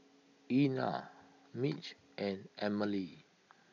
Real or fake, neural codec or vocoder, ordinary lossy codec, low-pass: real; none; none; 7.2 kHz